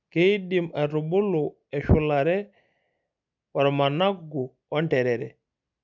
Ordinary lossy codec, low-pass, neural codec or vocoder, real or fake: none; 7.2 kHz; none; real